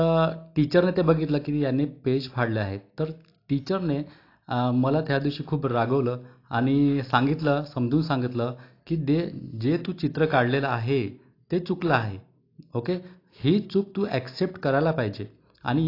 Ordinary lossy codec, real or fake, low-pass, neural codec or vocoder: AAC, 32 kbps; real; 5.4 kHz; none